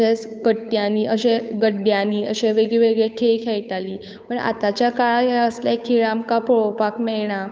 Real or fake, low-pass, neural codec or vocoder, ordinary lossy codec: fake; none; codec, 16 kHz, 8 kbps, FunCodec, trained on Chinese and English, 25 frames a second; none